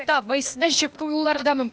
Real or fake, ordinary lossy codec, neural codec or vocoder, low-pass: fake; none; codec, 16 kHz, 0.8 kbps, ZipCodec; none